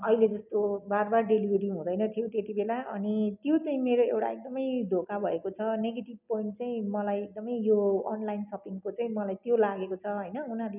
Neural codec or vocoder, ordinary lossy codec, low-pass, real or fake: none; none; 3.6 kHz; real